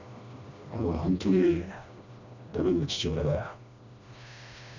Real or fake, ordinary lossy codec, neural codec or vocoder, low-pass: fake; none; codec, 16 kHz, 1 kbps, FreqCodec, smaller model; 7.2 kHz